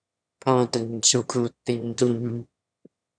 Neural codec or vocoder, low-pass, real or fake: autoencoder, 22.05 kHz, a latent of 192 numbers a frame, VITS, trained on one speaker; 9.9 kHz; fake